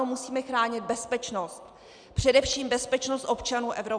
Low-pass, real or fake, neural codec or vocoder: 9.9 kHz; real; none